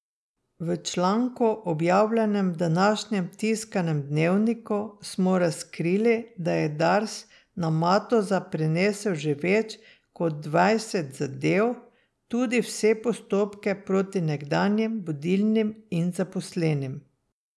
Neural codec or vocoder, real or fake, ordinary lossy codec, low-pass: none; real; none; none